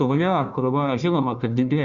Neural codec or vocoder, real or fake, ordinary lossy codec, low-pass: codec, 16 kHz, 1 kbps, FunCodec, trained on Chinese and English, 50 frames a second; fake; Opus, 64 kbps; 7.2 kHz